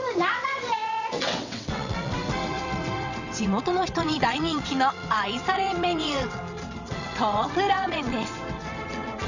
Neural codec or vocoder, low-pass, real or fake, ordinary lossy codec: vocoder, 22.05 kHz, 80 mel bands, WaveNeXt; 7.2 kHz; fake; none